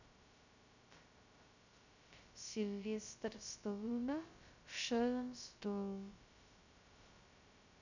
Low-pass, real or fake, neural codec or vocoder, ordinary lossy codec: 7.2 kHz; fake; codec, 16 kHz, 0.2 kbps, FocalCodec; none